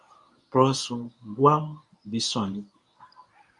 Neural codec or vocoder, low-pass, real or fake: codec, 24 kHz, 0.9 kbps, WavTokenizer, medium speech release version 1; 10.8 kHz; fake